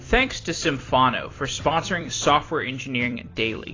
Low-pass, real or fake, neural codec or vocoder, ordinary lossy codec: 7.2 kHz; fake; vocoder, 44.1 kHz, 128 mel bands every 256 samples, BigVGAN v2; AAC, 32 kbps